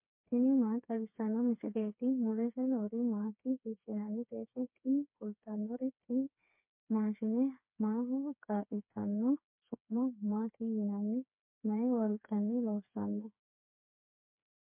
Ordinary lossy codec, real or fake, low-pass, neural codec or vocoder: MP3, 32 kbps; fake; 3.6 kHz; codec, 16 kHz, 4 kbps, FreqCodec, smaller model